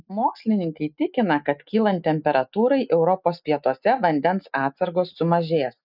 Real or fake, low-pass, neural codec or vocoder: real; 5.4 kHz; none